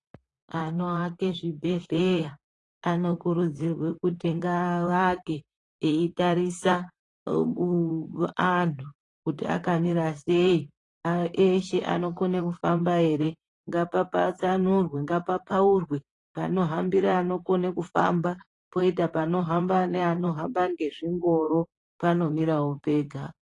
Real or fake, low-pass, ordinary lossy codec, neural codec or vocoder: fake; 10.8 kHz; AAC, 32 kbps; vocoder, 44.1 kHz, 128 mel bands, Pupu-Vocoder